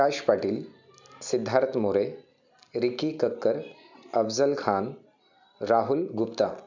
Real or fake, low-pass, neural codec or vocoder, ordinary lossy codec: real; 7.2 kHz; none; none